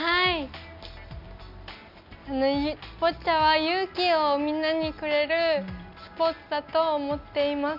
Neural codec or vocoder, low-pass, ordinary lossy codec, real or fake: none; 5.4 kHz; none; real